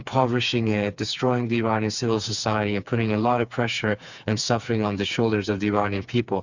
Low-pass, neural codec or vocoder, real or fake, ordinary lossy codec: 7.2 kHz; codec, 16 kHz, 4 kbps, FreqCodec, smaller model; fake; Opus, 64 kbps